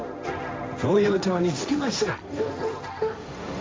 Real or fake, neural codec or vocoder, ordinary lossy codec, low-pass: fake; codec, 16 kHz, 1.1 kbps, Voila-Tokenizer; none; none